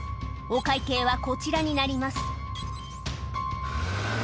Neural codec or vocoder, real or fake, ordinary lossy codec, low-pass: none; real; none; none